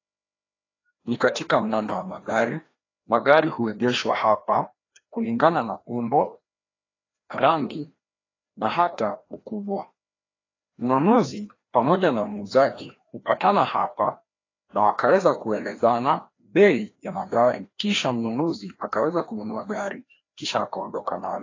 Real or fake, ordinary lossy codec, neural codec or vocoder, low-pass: fake; AAC, 32 kbps; codec, 16 kHz, 1 kbps, FreqCodec, larger model; 7.2 kHz